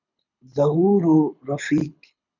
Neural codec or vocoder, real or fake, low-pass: codec, 24 kHz, 6 kbps, HILCodec; fake; 7.2 kHz